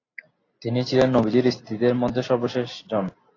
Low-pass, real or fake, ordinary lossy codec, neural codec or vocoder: 7.2 kHz; real; AAC, 32 kbps; none